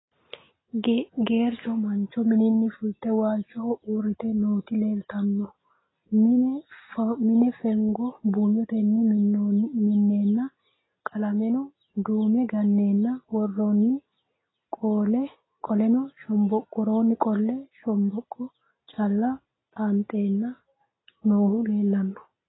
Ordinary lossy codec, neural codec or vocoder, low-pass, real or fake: AAC, 16 kbps; none; 7.2 kHz; real